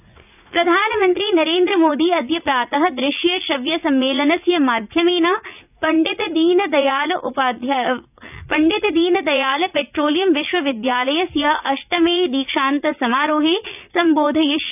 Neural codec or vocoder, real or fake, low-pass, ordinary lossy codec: vocoder, 44.1 kHz, 80 mel bands, Vocos; fake; 3.6 kHz; none